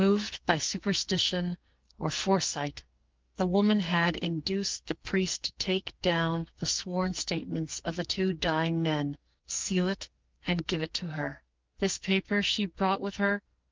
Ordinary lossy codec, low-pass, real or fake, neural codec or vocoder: Opus, 32 kbps; 7.2 kHz; fake; codec, 44.1 kHz, 2.6 kbps, SNAC